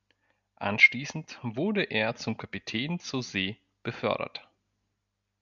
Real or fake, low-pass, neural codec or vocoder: real; 7.2 kHz; none